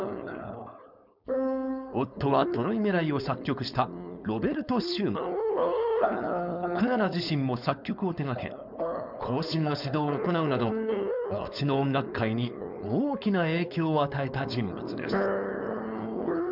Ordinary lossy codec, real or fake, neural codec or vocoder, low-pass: none; fake; codec, 16 kHz, 4.8 kbps, FACodec; 5.4 kHz